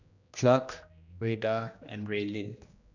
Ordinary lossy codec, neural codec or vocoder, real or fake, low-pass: none; codec, 16 kHz, 1 kbps, X-Codec, HuBERT features, trained on general audio; fake; 7.2 kHz